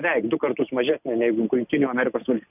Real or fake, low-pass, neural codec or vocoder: real; 3.6 kHz; none